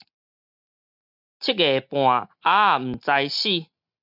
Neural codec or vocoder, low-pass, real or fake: none; 5.4 kHz; real